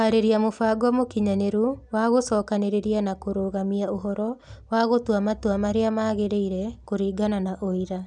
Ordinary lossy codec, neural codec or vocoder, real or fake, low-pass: none; none; real; 10.8 kHz